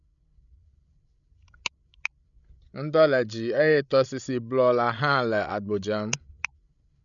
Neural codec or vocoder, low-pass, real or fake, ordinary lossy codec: codec, 16 kHz, 16 kbps, FreqCodec, larger model; 7.2 kHz; fake; none